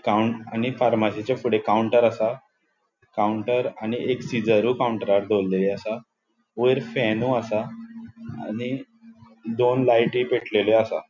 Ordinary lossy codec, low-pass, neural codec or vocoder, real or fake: none; 7.2 kHz; none; real